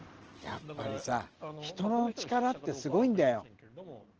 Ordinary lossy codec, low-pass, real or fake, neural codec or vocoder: Opus, 16 kbps; 7.2 kHz; real; none